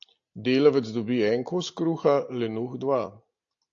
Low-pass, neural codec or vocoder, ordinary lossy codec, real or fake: 7.2 kHz; none; AAC, 48 kbps; real